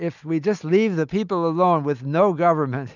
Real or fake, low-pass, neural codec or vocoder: real; 7.2 kHz; none